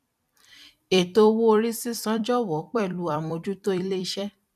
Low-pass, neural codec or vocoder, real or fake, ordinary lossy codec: 14.4 kHz; vocoder, 44.1 kHz, 128 mel bands every 256 samples, BigVGAN v2; fake; none